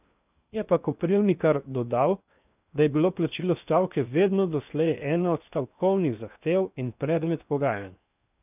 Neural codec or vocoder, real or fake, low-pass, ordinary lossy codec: codec, 16 kHz in and 24 kHz out, 0.8 kbps, FocalCodec, streaming, 65536 codes; fake; 3.6 kHz; none